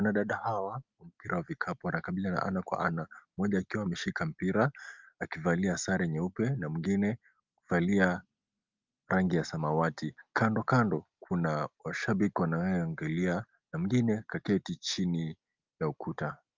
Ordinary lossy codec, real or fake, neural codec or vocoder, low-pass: Opus, 24 kbps; real; none; 7.2 kHz